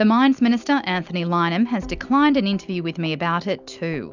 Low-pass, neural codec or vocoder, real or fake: 7.2 kHz; none; real